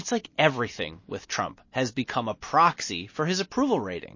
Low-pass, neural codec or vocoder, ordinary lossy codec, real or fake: 7.2 kHz; none; MP3, 32 kbps; real